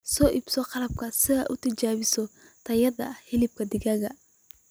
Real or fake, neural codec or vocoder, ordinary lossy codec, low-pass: real; none; none; none